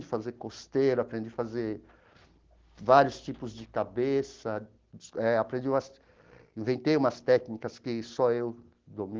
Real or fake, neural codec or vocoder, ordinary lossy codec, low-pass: real; none; Opus, 16 kbps; 7.2 kHz